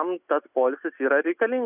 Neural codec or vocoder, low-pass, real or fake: none; 3.6 kHz; real